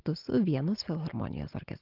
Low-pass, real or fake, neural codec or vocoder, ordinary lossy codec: 5.4 kHz; real; none; Opus, 16 kbps